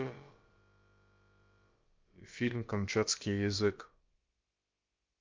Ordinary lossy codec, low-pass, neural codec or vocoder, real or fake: Opus, 24 kbps; 7.2 kHz; codec, 16 kHz, about 1 kbps, DyCAST, with the encoder's durations; fake